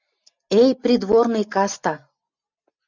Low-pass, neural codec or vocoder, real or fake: 7.2 kHz; none; real